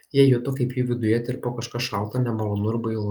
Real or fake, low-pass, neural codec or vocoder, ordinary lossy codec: real; 19.8 kHz; none; Opus, 24 kbps